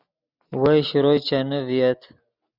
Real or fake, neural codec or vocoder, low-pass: real; none; 5.4 kHz